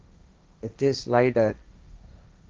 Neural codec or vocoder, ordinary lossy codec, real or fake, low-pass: codec, 16 kHz, 1.1 kbps, Voila-Tokenizer; Opus, 24 kbps; fake; 7.2 kHz